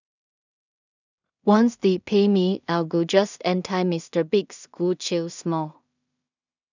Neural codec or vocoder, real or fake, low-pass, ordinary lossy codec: codec, 16 kHz in and 24 kHz out, 0.4 kbps, LongCat-Audio-Codec, two codebook decoder; fake; 7.2 kHz; none